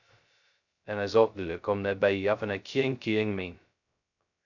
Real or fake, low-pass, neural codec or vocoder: fake; 7.2 kHz; codec, 16 kHz, 0.2 kbps, FocalCodec